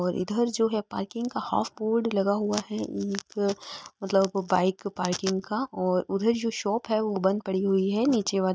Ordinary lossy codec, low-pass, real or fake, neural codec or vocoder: none; none; real; none